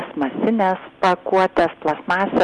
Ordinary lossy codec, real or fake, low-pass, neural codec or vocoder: Opus, 32 kbps; real; 10.8 kHz; none